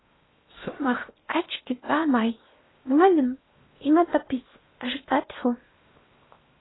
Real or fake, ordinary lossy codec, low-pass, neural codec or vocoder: fake; AAC, 16 kbps; 7.2 kHz; codec, 16 kHz in and 24 kHz out, 0.8 kbps, FocalCodec, streaming, 65536 codes